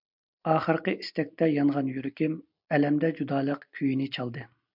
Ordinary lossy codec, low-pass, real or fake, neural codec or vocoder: MP3, 48 kbps; 5.4 kHz; real; none